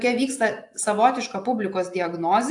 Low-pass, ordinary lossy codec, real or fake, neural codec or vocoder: 10.8 kHz; AAC, 64 kbps; real; none